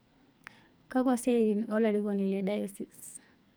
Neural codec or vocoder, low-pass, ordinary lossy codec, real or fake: codec, 44.1 kHz, 2.6 kbps, SNAC; none; none; fake